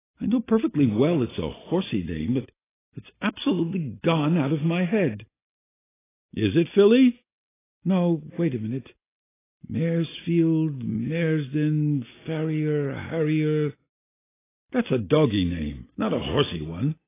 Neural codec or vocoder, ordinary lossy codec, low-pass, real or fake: none; AAC, 16 kbps; 3.6 kHz; real